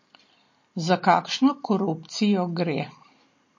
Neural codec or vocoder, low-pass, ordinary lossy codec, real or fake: none; 7.2 kHz; MP3, 32 kbps; real